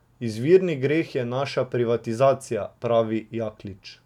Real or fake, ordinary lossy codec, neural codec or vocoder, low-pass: real; none; none; 19.8 kHz